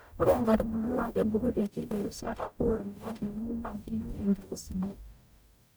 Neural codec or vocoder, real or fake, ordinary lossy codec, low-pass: codec, 44.1 kHz, 0.9 kbps, DAC; fake; none; none